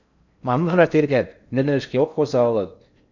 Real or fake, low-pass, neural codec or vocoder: fake; 7.2 kHz; codec, 16 kHz in and 24 kHz out, 0.6 kbps, FocalCodec, streaming, 4096 codes